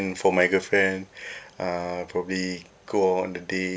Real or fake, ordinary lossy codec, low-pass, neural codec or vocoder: real; none; none; none